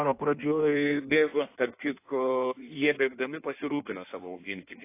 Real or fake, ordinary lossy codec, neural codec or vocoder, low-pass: fake; AAC, 24 kbps; codec, 16 kHz in and 24 kHz out, 1.1 kbps, FireRedTTS-2 codec; 3.6 kHz